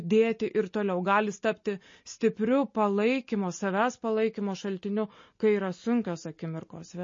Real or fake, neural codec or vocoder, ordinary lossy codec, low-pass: real; none; MP3, 32 kbps; 7.2 kHz